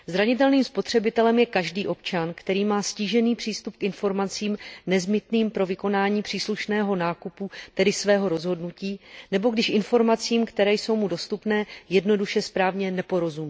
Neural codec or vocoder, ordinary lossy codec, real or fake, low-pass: none; none; real; none